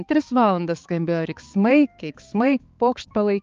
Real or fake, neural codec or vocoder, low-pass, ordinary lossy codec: fake; codec, 16 kHz, 4 kbps, X-Codec, HuBERT features, trained on balanced general audio; 7.2 kHz; Opus, 32 kbps